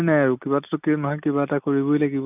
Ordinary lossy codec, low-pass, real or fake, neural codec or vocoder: none; 3.6 kHz; real; none